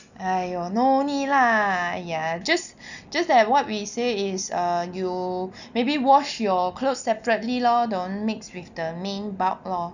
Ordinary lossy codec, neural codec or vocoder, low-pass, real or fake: none; none; 7.2 kHz; real